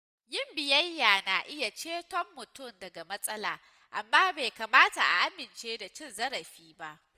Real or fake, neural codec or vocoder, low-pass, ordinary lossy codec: real; none; 14.4 kHz; Opus, 32 kbps